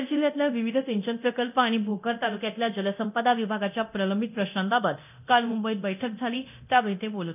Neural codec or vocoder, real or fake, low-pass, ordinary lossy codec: codec, 24 kHz, 0.9 kbps, DualCodec; fake; 3.6 kHz; none